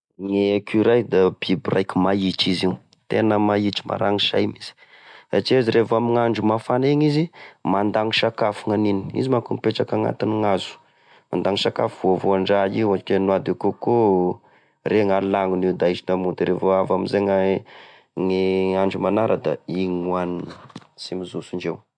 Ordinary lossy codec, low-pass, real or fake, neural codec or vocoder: MP3, 64 kbps; 9.9 kHz; real; none